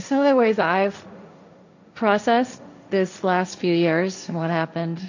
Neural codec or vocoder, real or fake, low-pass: codec, 16 kHz, 1.1 kbps, Voila-Tokenizer; fake; 7.2 kHz